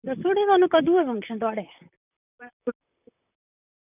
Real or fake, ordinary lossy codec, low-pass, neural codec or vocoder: fake; none; 3.6 kHz; vocoder, 44.1 kHz, 128 mel bands, Pupu-Vocoder